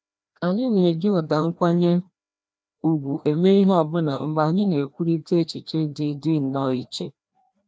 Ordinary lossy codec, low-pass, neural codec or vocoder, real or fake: none; none; codec, 16 kHz, 1 kbps, FreqCodec, larger model; fake